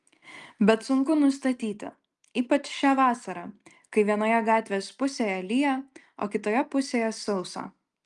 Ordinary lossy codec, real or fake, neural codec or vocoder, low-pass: Opus, 32 kbps; real; none; 10.8 kHz